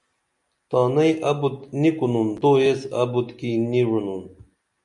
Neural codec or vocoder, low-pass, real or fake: none; 10.8 kHz; real